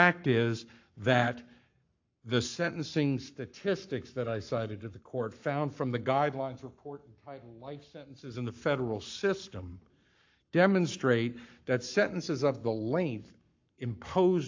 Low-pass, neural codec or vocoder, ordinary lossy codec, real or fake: 7.2 kHz; codec, 44.1 kHz, 7.8 kbps, Pupu-Codec; AAC, 48 kbps; fake